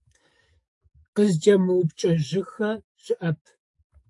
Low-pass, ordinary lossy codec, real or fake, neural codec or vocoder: 10.8 kHz; AAC, 64 kbps; fake; codec, 44.1 kHz, 7.8 kbps, DAC